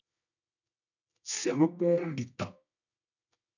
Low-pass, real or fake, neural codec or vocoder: 7.2 kHz; fake; codec, 24 kHz, 0.9 kbps, WavTokenizer, medium music audio release